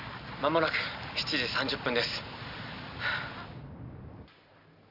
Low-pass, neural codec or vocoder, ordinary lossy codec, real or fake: 5.4 kHz; none; none; real